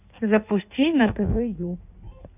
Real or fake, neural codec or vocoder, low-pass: fake; codec, 16 kHz in and 24 kHz out, 1.1 kbps, FireRedTTS-2 codec; 3.6 kHz